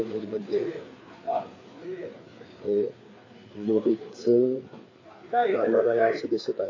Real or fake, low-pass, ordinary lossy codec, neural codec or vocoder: fake; 7.2 kHz; AAC, 32 kbps; codec, 16 kHz, 4 kbps, FreqCodec, larger model